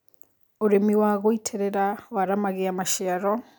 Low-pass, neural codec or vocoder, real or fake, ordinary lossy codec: none; none; real; none